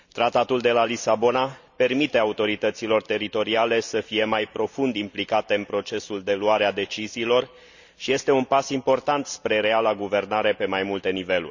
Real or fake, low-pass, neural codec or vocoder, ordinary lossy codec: real; 7.2 kHz; none; none